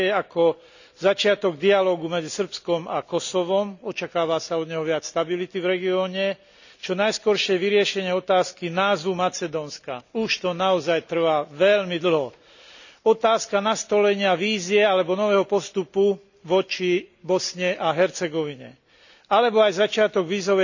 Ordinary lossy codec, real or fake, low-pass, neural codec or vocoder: none; real; 7.2 kHz; none